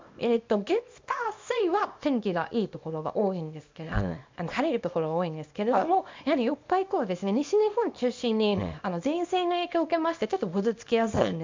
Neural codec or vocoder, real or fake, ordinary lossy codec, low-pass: codec, 24 kHz, 0.9 kbps, WavTokenizer, small release; fake; MP3, 64 kbps; 7.2 kHz